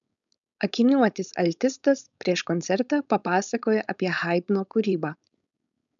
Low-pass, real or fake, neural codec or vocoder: 7.2 kHz; fake; codec, 16 kHz, 4.8 kbps, FACodec